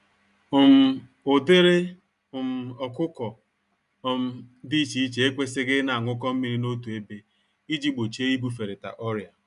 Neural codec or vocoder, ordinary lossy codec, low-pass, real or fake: none; none; 10.8 kHz; real